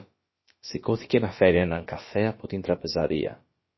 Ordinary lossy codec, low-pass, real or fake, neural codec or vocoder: MP3, 24 kbps; 7.2 kHz; fake; codec, 16 kHz, about 1 kbps, DyCAST, with the encoder's durations